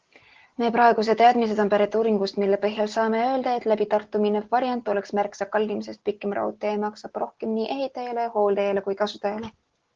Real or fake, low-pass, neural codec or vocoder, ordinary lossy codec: real; 7.2 kHz; none; Opus, 16 kbps